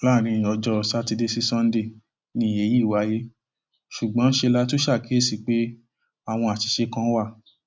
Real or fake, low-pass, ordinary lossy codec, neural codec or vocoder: real; none; none; none